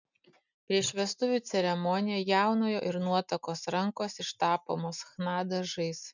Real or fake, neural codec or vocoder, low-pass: real; none; 7.2 kHz